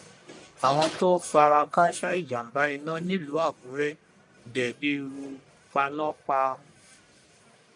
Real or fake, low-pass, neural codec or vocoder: fake; 10.8 kHz; codec, 44.1 kHz, 1.7 kbps, Pupu-Codec